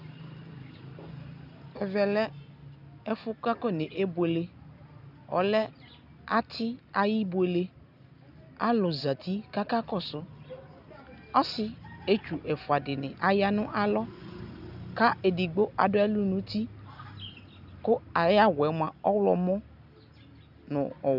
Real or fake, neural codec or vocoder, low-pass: real; none; 5.4 kHz